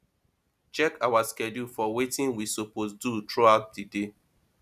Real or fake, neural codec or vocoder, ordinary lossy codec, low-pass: real; none; none; 14.4 kHz